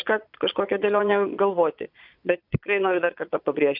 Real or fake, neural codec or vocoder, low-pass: fake; vocoder, 22.05 kHz, 80 mel bands, Vocos; 5.4 kHz